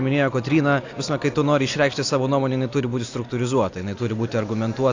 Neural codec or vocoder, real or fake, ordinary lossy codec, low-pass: none; real; AAC, 48 kbps; 7.2 kHz